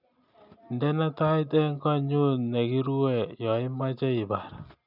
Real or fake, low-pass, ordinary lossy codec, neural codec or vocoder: real; 5.4 kHz; none; none